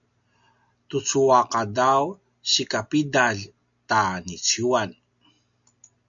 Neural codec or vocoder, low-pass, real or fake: none; 7.2 kHz; real